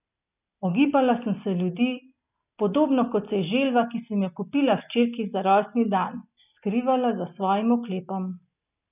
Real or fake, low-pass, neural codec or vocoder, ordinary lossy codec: real; 3.6 kHz; none; none